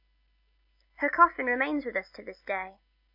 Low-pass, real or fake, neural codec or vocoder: 5.4 kHz; real; none